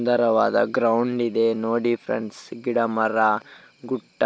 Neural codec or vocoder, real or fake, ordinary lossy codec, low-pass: none; real; none; none